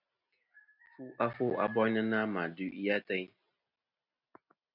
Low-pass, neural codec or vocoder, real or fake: 5.4 kHz; none; real